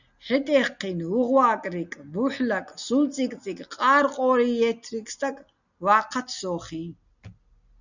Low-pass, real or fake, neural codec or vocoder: 7.2 kHz; real; none